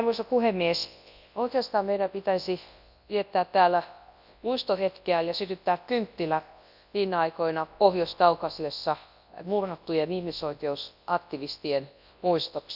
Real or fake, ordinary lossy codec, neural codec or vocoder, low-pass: fake; none; codec, 24 kHz, 0.9 kbps, WavTokenizer, large speech release; 5.4 kHz